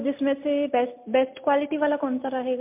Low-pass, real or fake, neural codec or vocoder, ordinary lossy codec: 3.6 kHz; real; none; MP3, 32 kbps